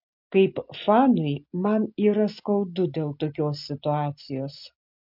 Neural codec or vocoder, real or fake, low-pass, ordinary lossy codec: none; real; 5.4 kHz; MP3, 48 kbps